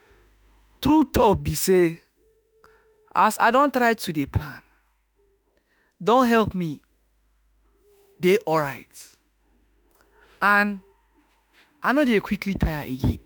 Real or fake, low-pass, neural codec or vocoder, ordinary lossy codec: fake; none; autoencoder, 48 kHz, 32 numbers a frame, DAC-VAE, trained on Japanese speech; none